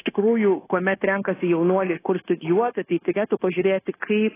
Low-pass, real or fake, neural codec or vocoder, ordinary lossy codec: 3.6 kHz; fake; codec, 16 kHz, 0.9 kbps, LongCat-Audio-Codec; AAC, 16 kbps